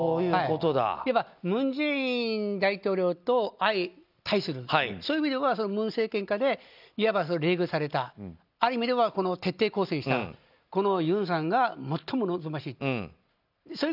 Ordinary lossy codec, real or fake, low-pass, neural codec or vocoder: none; real; 5.4 kHz; none